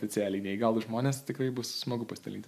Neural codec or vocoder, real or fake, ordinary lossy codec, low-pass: vocoder, 44.1 kHz, 128 mel bands every 512 samples, BigVGAN v2; fake; MP3, 96 kbps; 14.4 kHz